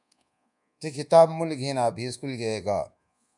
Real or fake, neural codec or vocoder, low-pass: fake; codec, 24 kHz, 1.2 kbps, DualCodec; 10.8 kHz